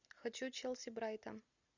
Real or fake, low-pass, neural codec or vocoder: real; 7.2 kHz; none